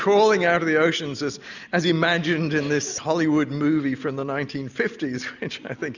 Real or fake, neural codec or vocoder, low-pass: real; none; 7.2 kHz